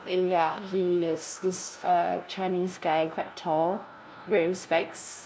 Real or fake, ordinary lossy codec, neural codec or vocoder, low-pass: fake; none; codec, 16 kHz, 0.5 kbps, FunCodec, trained on LibriTTS, 25 frames a second; none